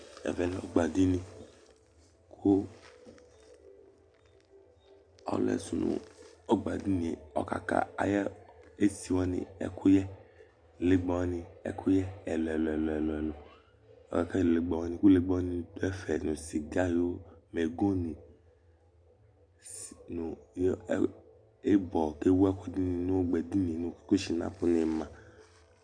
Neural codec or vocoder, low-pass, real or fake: none; 9.9 kHz; real